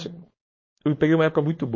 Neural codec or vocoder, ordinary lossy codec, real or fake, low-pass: codec, 16 kHz, 4.8 kbps, FACodec; MP3, 32 kbps; fake; 7.2 kHz